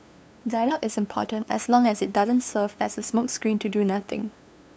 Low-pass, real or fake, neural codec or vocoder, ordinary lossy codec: none; fake; codec, 16 kHz, 2 kbps, FunCodec, trained on LibriTTS, 25 frames a second; none